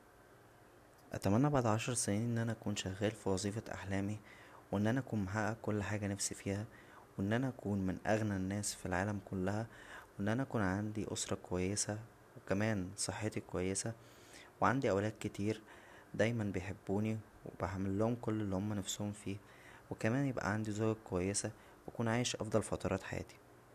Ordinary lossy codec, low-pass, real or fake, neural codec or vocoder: none; 14.4 kHz; real; none